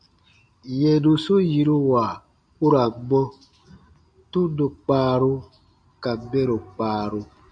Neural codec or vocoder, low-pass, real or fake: none; 9.9 kHz; real